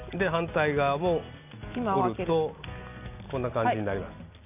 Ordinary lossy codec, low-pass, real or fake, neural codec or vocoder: none; 3.6 kHz; real; none